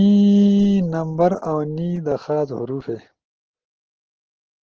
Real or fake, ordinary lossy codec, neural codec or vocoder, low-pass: real; Opus, 16 kbps; none; 7.2 kHz